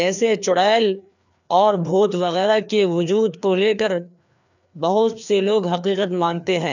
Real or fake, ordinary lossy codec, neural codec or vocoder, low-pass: fake; none; codec, 16 kHz, 2 kbps, FreqCodec, larger model; 7.2 kHz